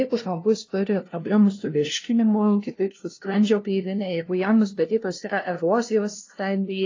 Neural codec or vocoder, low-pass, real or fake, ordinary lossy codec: codec, 16 kHz, 0.5 kbps, FunCodec, trained on LibriTTS, 25 frames a second; 7.2 kHz; fake; AAC, 32 kbps